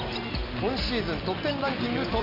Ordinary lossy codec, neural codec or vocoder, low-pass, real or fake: none; none; 5.4 kHz; real